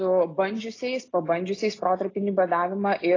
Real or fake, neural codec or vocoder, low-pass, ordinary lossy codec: real; none; 7.2 kHz; AAC, 32 kbps